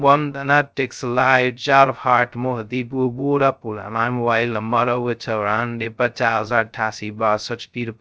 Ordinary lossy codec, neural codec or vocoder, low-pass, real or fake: none; codec, 16 kHz, 0.2 kbps, FocalCodec; none; fake